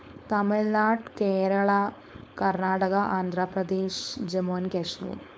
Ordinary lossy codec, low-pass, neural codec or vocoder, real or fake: none; none; codec, 16 kHz, 4.8 kbps, FACodec; fake